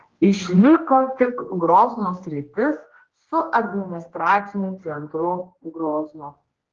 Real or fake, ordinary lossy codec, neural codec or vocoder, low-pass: fake; Opus, 16 kbps; codec, 16 kHz, 1 kbps, X-Codec, HuBERT features, trained on general audio; 7.2 kHz